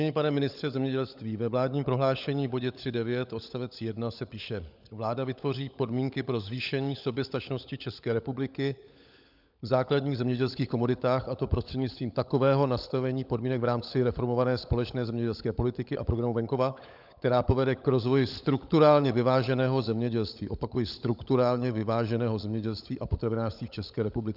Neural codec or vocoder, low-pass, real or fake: codec, 16 kHz, 16 kbps, FunCodec, trained on LibriTTS, 50 frames a second; 5.4 kHz; fake